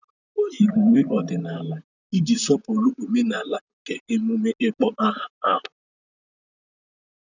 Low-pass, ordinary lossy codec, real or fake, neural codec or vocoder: 7.2 kHz; none; fake; vocoder, 44.1 kHz, 128 mel bands, Pupu-Vocoder